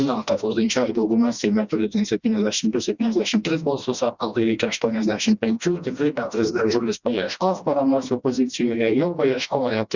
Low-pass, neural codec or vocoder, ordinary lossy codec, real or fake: 7.2 kHz; codec, 16 kHz, 1 kbps, FreqCodec, smaller model; Opus, 64 kbps; fake